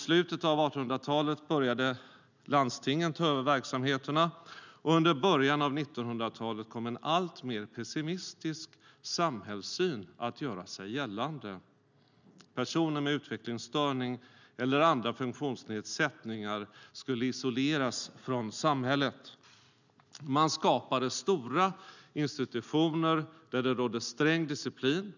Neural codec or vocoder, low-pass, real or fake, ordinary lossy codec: none; 7.2 kHz; real; none